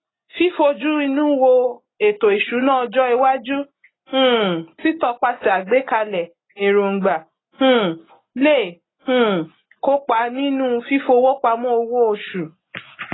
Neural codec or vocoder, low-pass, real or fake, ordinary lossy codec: none; 7.2 kHz; real; AAC, 16 kbps